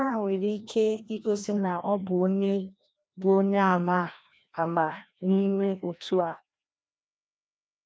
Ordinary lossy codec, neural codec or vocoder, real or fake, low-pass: none; codec, 16 kHz, 1 kbps, FreqCodec, larger model; fake; none